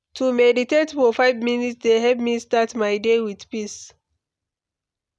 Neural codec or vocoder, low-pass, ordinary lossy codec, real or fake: none; none; none; real